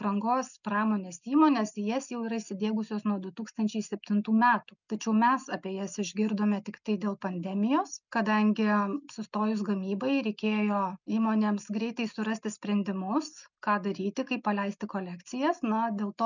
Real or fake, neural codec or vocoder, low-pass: real; none; 7.2 kHz